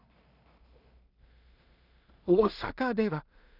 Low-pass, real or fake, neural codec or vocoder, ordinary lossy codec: 5.4 kHz; fake; codec, 16 kHz in and 24 kHz out, 0.4 kbps, LongCat-Audio-Codec, two codebook decoder; none